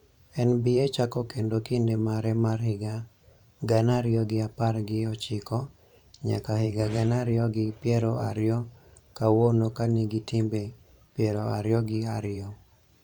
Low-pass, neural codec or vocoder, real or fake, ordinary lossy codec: 19.8 kHz; vocoder, 44.1 kHz, 128 mel bands every 512 samples, BigVGAN v2; fake; none